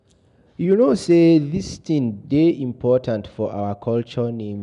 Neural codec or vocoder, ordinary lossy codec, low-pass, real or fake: none; none; 10.8 kHz; real